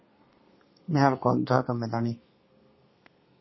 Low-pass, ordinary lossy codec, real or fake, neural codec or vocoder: 7.2 kHz; MP3, 24 kbps; fake; codec, 32 kHz, 1.9 kbps, SNAC